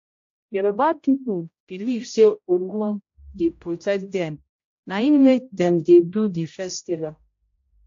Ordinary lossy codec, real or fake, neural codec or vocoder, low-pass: AAC, 48 kbps; fake; codec, 16 kHz, 0.5 kbps, X-Codec, HuBERT features, trained on general audio; 7.2 kHz